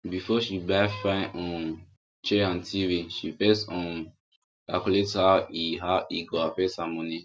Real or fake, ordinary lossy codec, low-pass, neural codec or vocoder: real; none; none; none